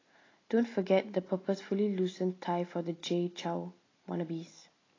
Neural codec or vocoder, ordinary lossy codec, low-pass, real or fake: none; AAC, 32 kbps; 7.2 kHz; real